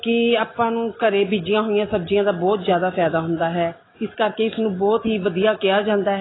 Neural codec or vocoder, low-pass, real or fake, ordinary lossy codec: none; 7.2 kHz; real; AAC, 16 kbps